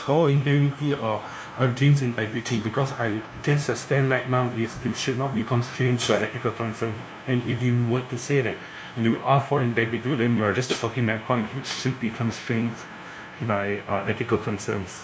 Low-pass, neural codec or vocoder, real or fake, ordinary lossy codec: none; codec, 16 kHz, 0.5 kbps, FunCodec, trained on LibriTTS, 25 frames a second; fake; none